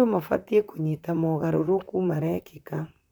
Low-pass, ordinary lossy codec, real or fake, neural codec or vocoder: 19.8 kHz; none; fake; vocoder, 44.1 kHz, 128 mel bands, Pupu-Vocoder